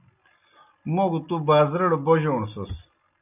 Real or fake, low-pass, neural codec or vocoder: real; 3.6 kHz; none